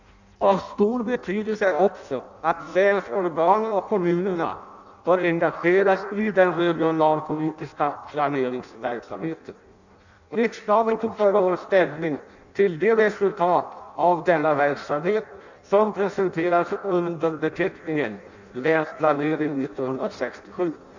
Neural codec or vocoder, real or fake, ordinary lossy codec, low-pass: codec, 16 kHz in and 24 kHz out, 0.6 kbps, FireRedTTS-2 codec; fake; none; 7.2 kHz